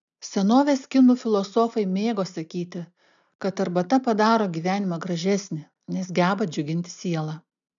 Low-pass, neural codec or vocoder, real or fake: 7.2 kHz; none; real